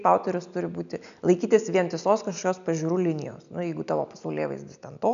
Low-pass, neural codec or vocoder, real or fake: 7.2 kHz; none; real